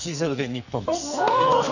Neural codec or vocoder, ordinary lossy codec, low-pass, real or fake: codec, 44.1 kHz, 2.6 kbps, SNAC; none; 7.2 kHz; fake